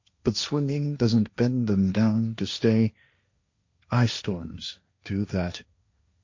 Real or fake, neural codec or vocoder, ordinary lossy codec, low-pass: fake; codec, 16 kHz, 1.1 kbps, Voila-Tokenizer; MP3, 48 kbps; 7.2 kHz